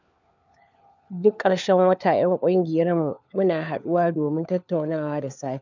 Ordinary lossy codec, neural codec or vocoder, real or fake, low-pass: none; codec, 16 kHz, 4 kbps, FunCodec, trained on LibriTTS, 50 frames a second; fake; 7.2 kHz